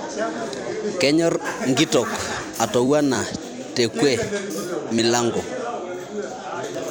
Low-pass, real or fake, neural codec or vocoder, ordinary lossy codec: none; fake; vocoder, 44.1 kHz, 128 mel bands every 256 samples, BigVGAN v2; none